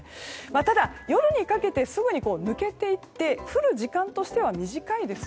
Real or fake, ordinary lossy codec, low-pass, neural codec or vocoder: real; none; none; none